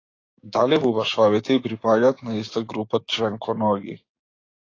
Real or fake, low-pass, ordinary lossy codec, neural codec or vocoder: fake; 7.2 kHz; AAC, 32 kbps; codec, 16 kHz, 6 kbps, DAC